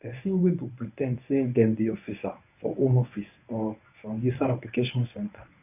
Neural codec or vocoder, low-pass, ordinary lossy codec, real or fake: codec, 24 kHz, 0.9 kbps, WavTokenizer, medium speech release version 1; 3.6 kHz; none; fake